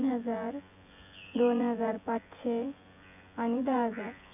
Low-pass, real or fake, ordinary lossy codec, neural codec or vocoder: 3.6 kHz; fake; none; vocoder, 24 kHz, 100 mel bands, Vocos